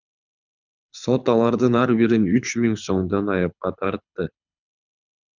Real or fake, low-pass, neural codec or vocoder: fake; 7.2 kHz; codec, 24 kHz, 6 kbps, HILCodec